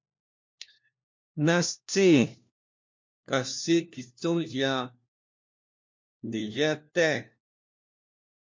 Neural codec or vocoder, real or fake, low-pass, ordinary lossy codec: codec, 16 kHz, 1 kbps, FunCodec, trained on LibriTTS, 50 frames a second; fake; 7.2 kHz; MP3, 48 kbps